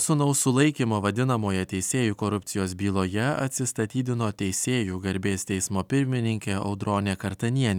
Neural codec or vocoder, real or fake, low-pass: none; real; 19.8 kHz